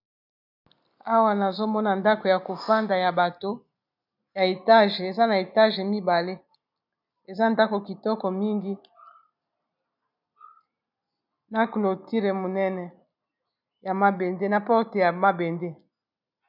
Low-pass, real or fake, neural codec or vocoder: 5.4 kHz; real; none